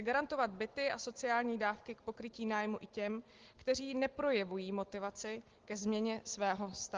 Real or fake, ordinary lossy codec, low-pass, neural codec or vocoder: real; Opus, 16 kbps; 7.2 kHz; none